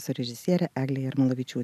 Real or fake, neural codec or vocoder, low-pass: real; none; 14.4 kHz